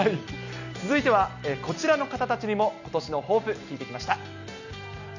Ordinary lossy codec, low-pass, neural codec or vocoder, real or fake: none; 7.2 kHz; none; real